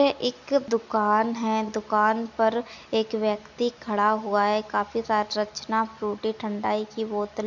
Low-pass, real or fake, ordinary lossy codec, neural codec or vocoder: 7.2 kHz; real; none; none